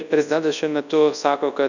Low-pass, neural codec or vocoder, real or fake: 7.2 kHz; codec, 24 kHz, 0.9 kbps, WavTokenizer, large speech release; fake